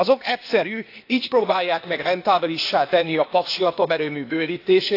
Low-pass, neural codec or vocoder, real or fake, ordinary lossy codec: 5.4 kHz; codec, 16 kHz, 0.8 kbps, ZipCodec; fake; AAC, 32 kbps